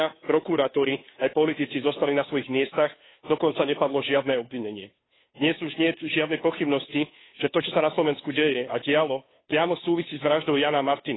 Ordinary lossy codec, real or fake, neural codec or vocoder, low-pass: AAC, 16 kbps; fake; codec, 16 kHz, 2 kbps, FunCodec, trained on Chinese and English, 25 frames a second; 7.2 kHz